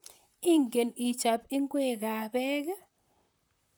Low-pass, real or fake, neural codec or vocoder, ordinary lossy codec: none; fake; vocoder, 44.1 kHz, 128 mel bands, Pupu-Vocoder; none